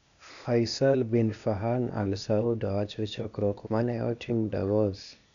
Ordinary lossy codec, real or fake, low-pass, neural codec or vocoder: none; fake; 7.2 kHz; codec, 16 kHz, 0.8 kbps, ZipCodec